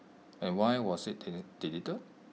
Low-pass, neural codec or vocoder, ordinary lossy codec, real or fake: none; none; none; real